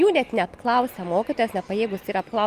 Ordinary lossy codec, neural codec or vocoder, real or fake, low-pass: Opus, 32 kbps; none; real; 14.4 kHz